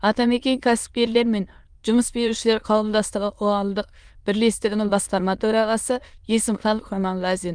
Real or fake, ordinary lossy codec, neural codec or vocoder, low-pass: fake; Opus, 32 kbps; autoencoder, 22.05 kHz, a latent of 192 numbers a frame, VITS, trained on many speakers; 9.9 kHz